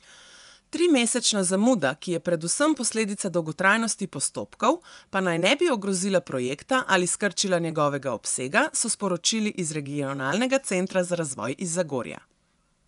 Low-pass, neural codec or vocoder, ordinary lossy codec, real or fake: 10.8 kHz; vocoder, 24 kHz, 100 mel bands, Vocos; none; fake